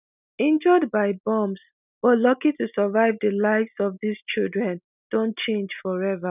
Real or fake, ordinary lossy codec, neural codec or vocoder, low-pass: real; none; none; 3.6 kHz